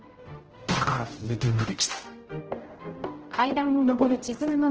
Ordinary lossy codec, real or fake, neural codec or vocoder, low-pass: Opus, 16 kbps; fake; codec, 16 kHz, 0.5 kbps, X-Codec, HuBERT features, trained on general audio; 7.2 kHz